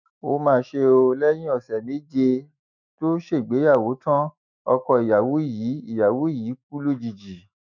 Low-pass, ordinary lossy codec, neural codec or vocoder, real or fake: 7.2 kHz; none; autoencoder, 48 kHz, 128 numbers a frame, DAC-VAE, trained on Japanese speech; fake